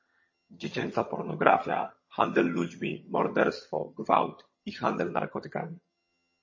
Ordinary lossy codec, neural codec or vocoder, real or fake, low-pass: MP3, 32 kbps; vocoder, 22.05 kHz, 80 mel bands, HiFi-GAN; fake; 7.2 kHz